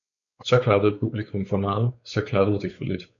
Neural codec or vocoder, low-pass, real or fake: codec, 16 kHz, 4 kbps, X-Codec, WavLM features, trained on Multilingual LibriSpeech; 7.2 kHz; fake